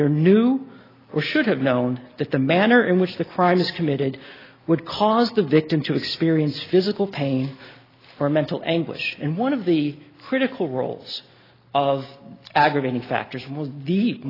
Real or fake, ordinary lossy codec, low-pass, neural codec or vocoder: real; AAC, 24 kbps; 5.4 kHz; none